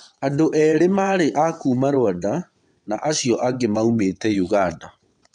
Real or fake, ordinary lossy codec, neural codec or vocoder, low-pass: fake; none; vocoder, 22.05 kHz, 80 mel bands, WaveNeXt; 9.9 kHz